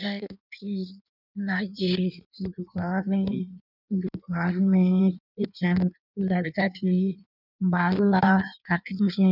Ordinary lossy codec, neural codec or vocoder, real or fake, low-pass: none; codec, 16 kHz in and 24 kHz out, 1.1 kbps, FireRedTTS-2 codec; fake; 5.4 kHz